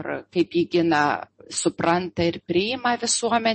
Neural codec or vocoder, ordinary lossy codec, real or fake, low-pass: none; MP3, 32 kbps; real; 10.8 kHz